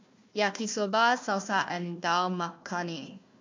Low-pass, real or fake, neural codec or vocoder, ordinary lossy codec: 7.2 kHz; fake; codec, 16 kHz, 1 kbps, FunCodec, trained on Chinese and English, 50 frames a second; MP3, 64 kbps